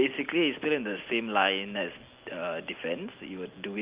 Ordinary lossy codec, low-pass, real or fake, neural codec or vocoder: Opus, 24 kbps; 3.6 kHz; real; none